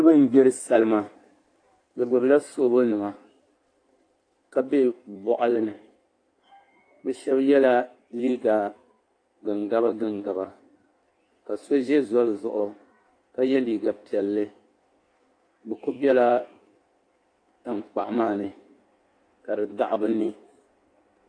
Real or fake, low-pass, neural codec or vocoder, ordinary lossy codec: fake; 9.9 kHz; codec, 16 kHz in and 24 kHz out, 1.1 kbps, FireRedTTS-2 codec; AAC, 48 kbps